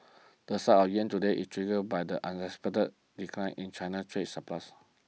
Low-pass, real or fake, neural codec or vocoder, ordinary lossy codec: none; real; none; none